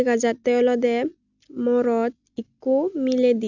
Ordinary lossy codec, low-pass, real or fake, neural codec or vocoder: none; 7.2 kHz; real; none